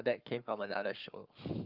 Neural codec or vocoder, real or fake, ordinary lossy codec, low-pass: codec, 44.1 kHz, 7.8 kbps, Pupu-Codec; fake; Opus, 24 kbps; 5.4 kHz